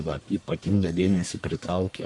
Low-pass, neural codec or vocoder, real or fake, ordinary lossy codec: 10.8 kHz; codec, 44.1 kHz, 1.7 kbps, Pupu-Codec; fake; MP3, 64 kbps